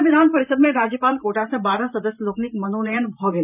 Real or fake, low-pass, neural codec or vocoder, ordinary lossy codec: fake; 3.6 kHz; vocoder, 44.1 kHz, 128 mel bands every 512 samples, BigVGAN v2; none